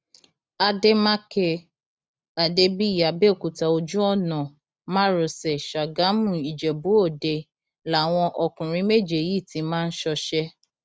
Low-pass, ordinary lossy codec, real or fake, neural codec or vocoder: none; none; real; none